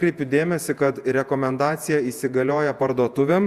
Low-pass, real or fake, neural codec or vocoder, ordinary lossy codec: 14.4 kHz; fake; vocoder, 48 kHz, 128 mel bands, Vocos; Opus, 64 kbps